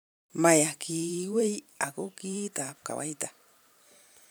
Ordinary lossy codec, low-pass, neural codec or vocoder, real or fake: none; none; vocoder, 44.1 kHz, 128 mel bands every 512 samples, BigVGAN v2; fake